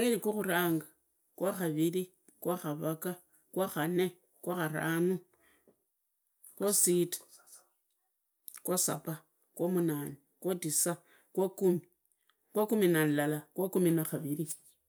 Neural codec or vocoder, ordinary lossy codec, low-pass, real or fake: none; none; none; real